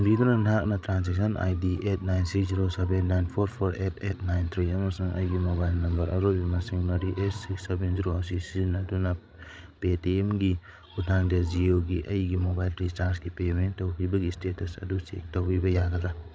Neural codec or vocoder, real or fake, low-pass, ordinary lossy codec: codec, 16 kHz, 16 kbps, FreqCodec, larger model; fake; none; none